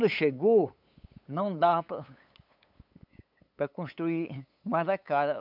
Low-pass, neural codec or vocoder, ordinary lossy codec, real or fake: 5.4 kHz; codec, 16 kHz, 8 kbps, FunCodec, trained on LibriTTS, 25 frames a second; none; fake